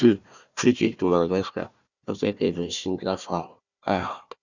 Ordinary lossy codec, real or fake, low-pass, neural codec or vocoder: Opus, 64 kbps; fake; 7.2 kHz; codec, 16 kHz, 1 kbps, FunCodec, trained on Chinese and English, 50 frames a second